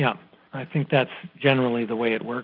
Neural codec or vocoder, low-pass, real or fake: none; 5.4 kHz; real